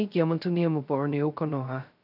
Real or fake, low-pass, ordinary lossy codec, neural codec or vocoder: fake; 5.4 kHz; none; codec, 16 kHz, 0.2 kbps, FocalCodec